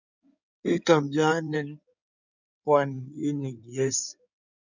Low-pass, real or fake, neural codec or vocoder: 7.2 kHz; fake; codec, 16 kHz in and 24 kHz out, 2.2 kbps, FireRedTTS-2 codec